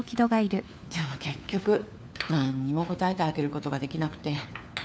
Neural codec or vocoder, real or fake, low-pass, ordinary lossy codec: codec, 16 kHz, 4 kbps, FunCodec, trained on LibriTTS, 50 frames a second; fake; none; none